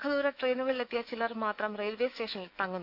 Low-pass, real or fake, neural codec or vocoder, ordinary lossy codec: 5.4 kHz; fake; autoencoder, 48 kHz, 128 numbers a frame, DAC-VAE, trained on Japanese speech; none